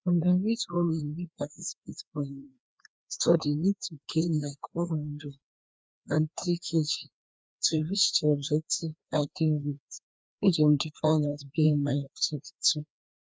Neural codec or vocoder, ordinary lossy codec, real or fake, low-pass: codec, 16 kHz, 2 kbps, FreqCodec, larger model; none; fake; none